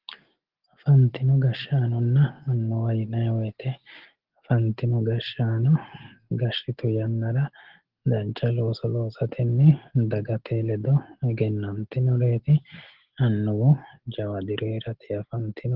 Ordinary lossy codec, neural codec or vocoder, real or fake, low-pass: Opus, 16 kbps; none; real; 5.4 kHz